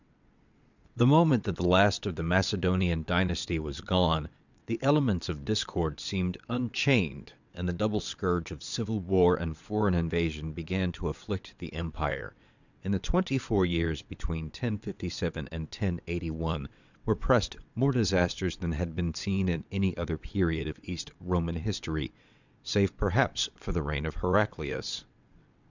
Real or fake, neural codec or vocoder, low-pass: fake; vocoder, 22.05 kHz, 80 mel bands, WaveNeXt; 7.2 kHz